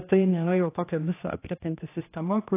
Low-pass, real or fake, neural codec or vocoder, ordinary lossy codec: 3.6 kHz; fake; codec, 16 kHz, 1 kbps, X-Codec, HuBERT features, trained on general audio; AAC, 24 kbps